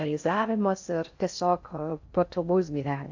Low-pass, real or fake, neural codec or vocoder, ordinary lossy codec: 7.2 kHz; fake; codec, 16 kHz in and 24 kHz out, 0.6 kbps, FocalCodec, streaming, 4096 codes; AAC, 48 kbps